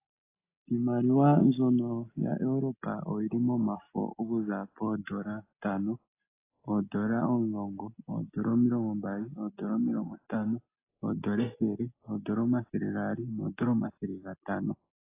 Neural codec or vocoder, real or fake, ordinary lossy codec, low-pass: none; real; AAC, 24 kbps; 3.6 kHz